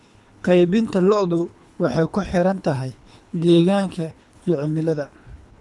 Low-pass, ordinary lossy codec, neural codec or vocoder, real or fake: none; none; codec, 24 kHz, 3 kbps, HILCodec; fake